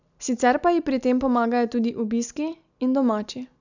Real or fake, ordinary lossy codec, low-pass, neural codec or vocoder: real; none; 7.2 kHz; none